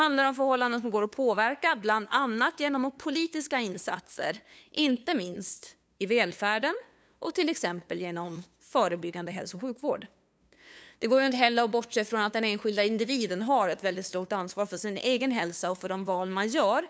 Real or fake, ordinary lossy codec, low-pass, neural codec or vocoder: fake; none; none; codec, 16 kHz, 2 kbps, FunCodec, trained on LibriTTS, 25 frames a second